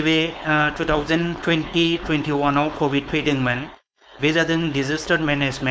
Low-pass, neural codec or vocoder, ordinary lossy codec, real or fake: none; codec, 16 kHz, 4.8 kbps, FACodec; none; fake